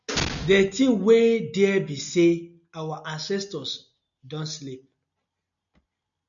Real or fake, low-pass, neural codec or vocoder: real; 7.2 kHz; none